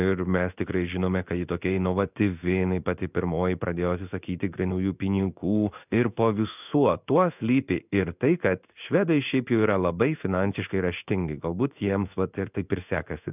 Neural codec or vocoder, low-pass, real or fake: codec, 16 kHz in and 24 kHz out, 1 kbps, XY-Tokenizer; 3.6 kHz; fake